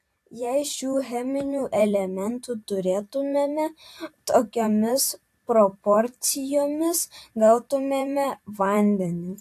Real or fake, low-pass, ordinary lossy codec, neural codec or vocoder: fake; 14.4 kHz; AAC, 64 kbps; vocoder, 44.1 kHz, 128 mel bands every 512 samples, BigVGAN v2